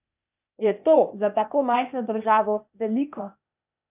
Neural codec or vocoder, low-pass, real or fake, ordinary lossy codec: codec, 16 kHz, 0.8 kbps, ZipCodec; 3.6 kHz; fake; none